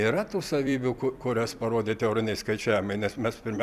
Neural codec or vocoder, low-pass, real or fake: vocoder, 44.1 kHz, 128 mel bands every 256 samples, BigVGAN v2; 14.4 kHz; fake